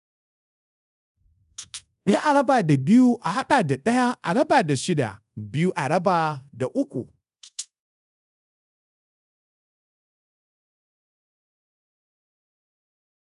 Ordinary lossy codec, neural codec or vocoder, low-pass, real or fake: none; codec, 24 kHz, 0.5 kbps, DualCodec; 10.8 kHz; fake